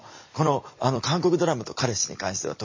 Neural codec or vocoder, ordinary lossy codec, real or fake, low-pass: vocoder, 44.1 kHz, 128 mel bands every 256 samples, BigVGAN v2; MP3, 32 kbps; fake; 7.2 kHz